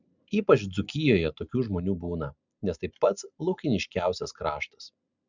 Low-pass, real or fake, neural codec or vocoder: 7.2 kHz; real; none